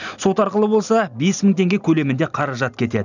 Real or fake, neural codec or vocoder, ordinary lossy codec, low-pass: real; none; none; 7.2 kHz